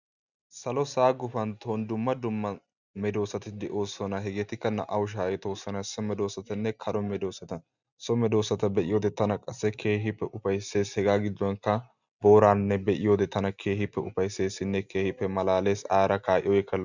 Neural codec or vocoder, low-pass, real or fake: none; 7.2 kHz; real